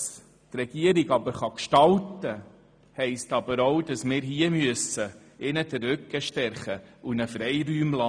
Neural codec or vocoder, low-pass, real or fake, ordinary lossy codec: none; 9.9 kHz; real; none